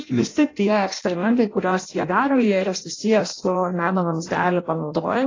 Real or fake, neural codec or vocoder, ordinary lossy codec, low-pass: fake; codec, 16 kHz in and 24 kHz out, 0.6 kbps, FireRedTTS-2 codec; AAC, 32 kbps; 7.2 kHz